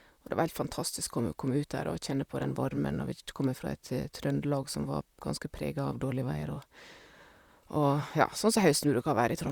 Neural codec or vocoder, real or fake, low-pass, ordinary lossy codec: vocoder, 44.1 kHz, 128 mel bands, Pupu-Vocoder; fake; 19.8 kHz; Opus, 64 kbps